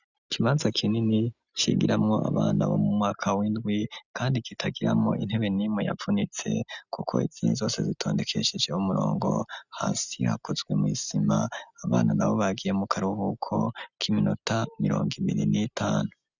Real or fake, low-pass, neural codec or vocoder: real; 7.2 kHz; none